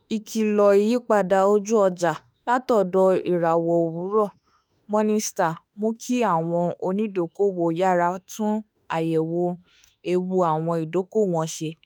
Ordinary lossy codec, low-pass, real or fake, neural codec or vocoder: none; none; fake; autoencoder, 48 kHz, 32 numbers a frame, DAC-VAE, trained on Japanese speech